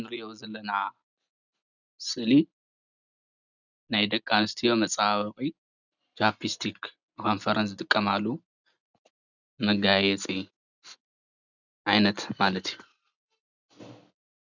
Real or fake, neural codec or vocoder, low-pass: real; none; 7.2 kHz